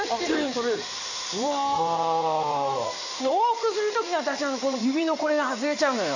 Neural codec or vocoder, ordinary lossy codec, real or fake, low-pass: codec, 16 kHz in and 24 kHz out, 2.2 kbps, FireRedTTS-2 codec; none; fake; 7.2 kHz